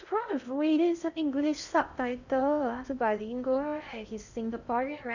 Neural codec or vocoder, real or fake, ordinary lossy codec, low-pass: codec, 16 kHz in and 24 kHz out, 0.6 kbps, FocalCodec, streaming, 4096 codes; fake; none; 7.2 kHz